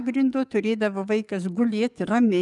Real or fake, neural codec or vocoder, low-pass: fake; codec, 44.1 kHz, 7.8 kbps, DAC; 10.8 kHz